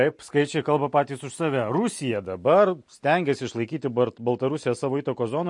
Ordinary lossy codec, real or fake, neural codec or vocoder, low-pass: MP3, 48 kbps; real; none; 10.8 kHz